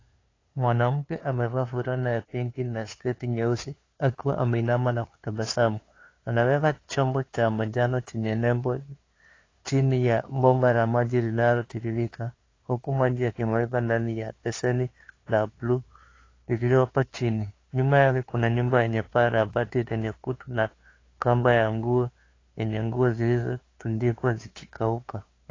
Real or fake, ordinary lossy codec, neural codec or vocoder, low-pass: fake; AAC, 32 kbps; codec, 16 kHz, 2 kbps, FunCodec, trained on LibriTTS, 25 frames a second; 7.2 kHz